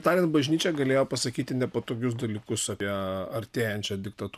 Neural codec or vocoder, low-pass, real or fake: none; 14.4 kHz; real